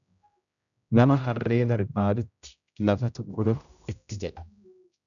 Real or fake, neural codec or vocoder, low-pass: fake; codec, 16 kHz, 0.5 kbps, X-Codec, HuBERT features, trained on general audio; 7.2 kHz